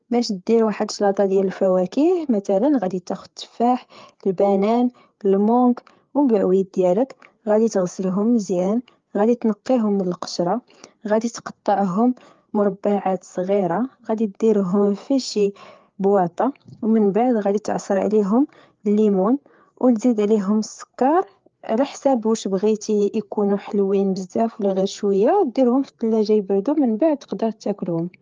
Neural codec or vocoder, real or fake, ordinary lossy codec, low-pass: codec, 16 kHz, 4 kbps, FreqCodec, larger model; fake; Opus, 32 kbps; 7.2 kHz